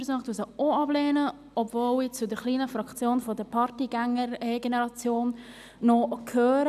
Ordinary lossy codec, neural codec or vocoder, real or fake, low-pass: none; none; real; 14.4 kHz